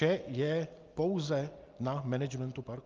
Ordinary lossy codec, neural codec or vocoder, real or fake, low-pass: Opus, 24 kbps; none; real; 7.2 kHz